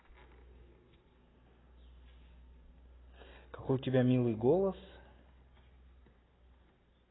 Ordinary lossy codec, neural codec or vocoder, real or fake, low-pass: AAC, 16 kbps; none; real; 7.2 kHz